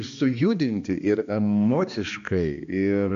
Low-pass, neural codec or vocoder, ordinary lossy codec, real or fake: 7.2 kHz; codec, 16 kHz, 2 kbps, X-Codec, HuBERT features, trained on balanced general audio; MP3, 48 kbps; fake